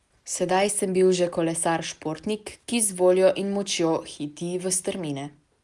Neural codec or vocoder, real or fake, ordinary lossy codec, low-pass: none; real; Opus, 32 kbps; 10.8 kHz